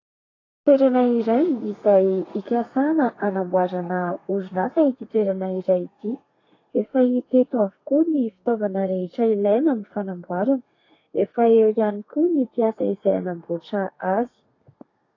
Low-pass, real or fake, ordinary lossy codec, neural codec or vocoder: 7.2 kHz; fake; AAC, 32 kbps; codec, 32 kHz, 1.9 kbps, SNAC